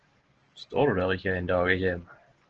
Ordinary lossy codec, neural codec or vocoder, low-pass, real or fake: Opus, 16 kbps; none; 7.2 kHz; real